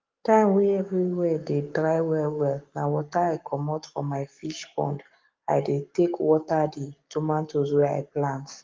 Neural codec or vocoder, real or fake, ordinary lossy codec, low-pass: codec, 44.1 kHz, 7.8 kbps, Pupu-Codec; fake; Opus, 32 kbps; 7.2 kHz